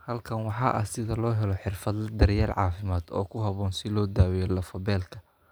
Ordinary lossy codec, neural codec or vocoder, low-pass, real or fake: none; none; none; real